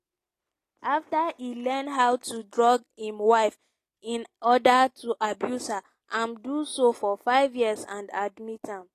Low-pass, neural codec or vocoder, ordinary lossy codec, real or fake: 14.4 kHz; none; AAC, 48 kbps; real